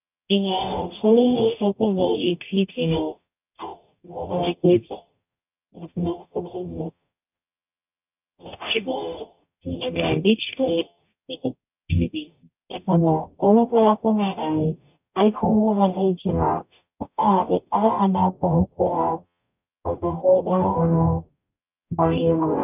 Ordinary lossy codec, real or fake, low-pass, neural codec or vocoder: none; fake; 3.6 kHz; codec, 44.1 kHz, 0.9 kbps, DAC